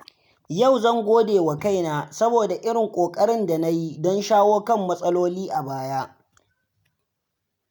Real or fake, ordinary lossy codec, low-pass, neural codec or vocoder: real; none; none; none